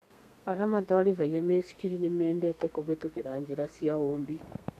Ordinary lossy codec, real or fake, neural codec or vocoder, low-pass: none; fake; codec, 32 kHz, 1.9 kbps, SNAC; 14.4 kHz